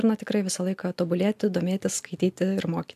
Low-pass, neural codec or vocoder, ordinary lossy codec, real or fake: 14.4 kHz; none; AAC, 96 kbps; real